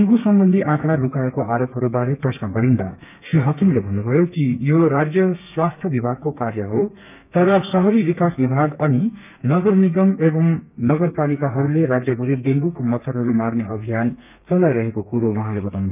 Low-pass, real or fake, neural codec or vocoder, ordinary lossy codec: 3.6 kHz; fake; codec, 32 kHz, 1.9 kbps, SNAC; none